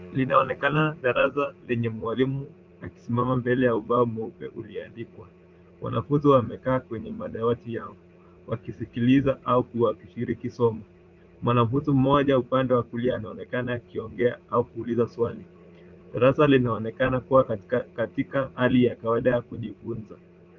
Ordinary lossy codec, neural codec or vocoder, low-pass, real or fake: Opus, 24 kbps; vocoder, 44.1 kHz, 80 mel bands, Vocos; 7.2 kHz; fake